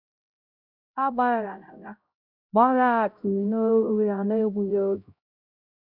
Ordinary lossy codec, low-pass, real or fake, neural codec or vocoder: none; 5.4 kHz; fake; codec, 16 kHz, 0.5 kbps, X-Codec, HuBERT features, trained on LibriSpeech